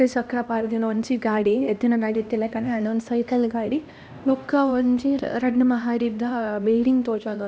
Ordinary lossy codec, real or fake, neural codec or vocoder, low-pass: none; fake; codec, 16 kHz, 1 kbps, X-Codec, HuBERT features, trained on LibriSpeech; none